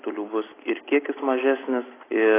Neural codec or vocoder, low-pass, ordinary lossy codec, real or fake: none; 3.6 kHz; AAC, 16 kbps; real